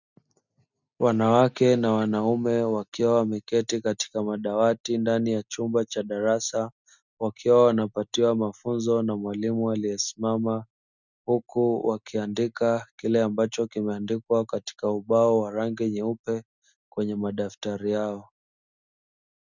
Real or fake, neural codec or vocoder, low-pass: real; none; 7.2 kHz